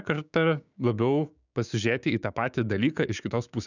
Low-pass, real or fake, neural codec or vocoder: 7.2 kHz; fake; codec, 16 kHz, 6 kbps, DAC